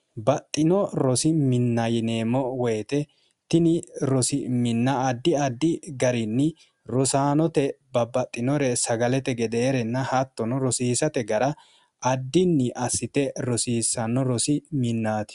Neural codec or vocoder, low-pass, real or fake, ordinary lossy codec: none; 10.8 kHz; real; Opus, 64 kbps